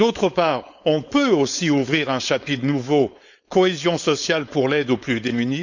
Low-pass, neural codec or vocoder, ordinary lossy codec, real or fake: 7.2 kHz; codec, 16 kHz, 4.8 kbps, FACodec; none; fake